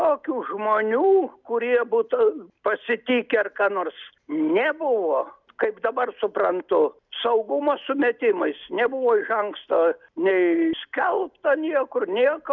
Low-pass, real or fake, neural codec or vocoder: 7.2 kHz; real; none